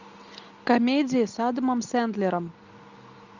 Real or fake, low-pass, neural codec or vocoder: real; 7.2 kHz; none